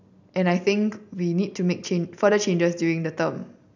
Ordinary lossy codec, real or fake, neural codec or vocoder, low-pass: none; real; none; 7.2 kHz